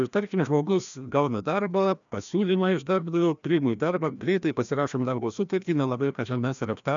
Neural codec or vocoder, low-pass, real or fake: codec, 16 kHz, 1 kbps, FreqCodec, larger model; 7.2 kHz; fake